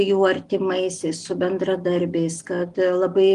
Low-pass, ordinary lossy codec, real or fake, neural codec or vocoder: 10.8 kHz; Opus, 24 kbps; real; none